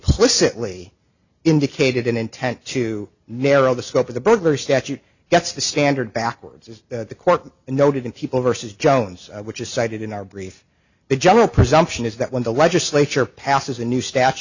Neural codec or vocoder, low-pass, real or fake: none; 7.2 kHz; real